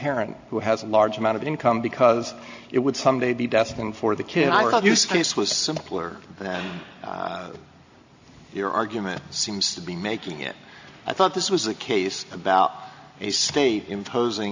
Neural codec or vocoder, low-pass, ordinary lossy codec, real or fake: none; 7.2 kHz; AAC, 48 kbps; real